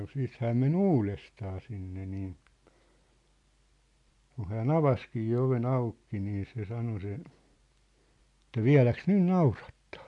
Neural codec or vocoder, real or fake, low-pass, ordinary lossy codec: none; real; 10.8 kHz; MP3, 64 kbps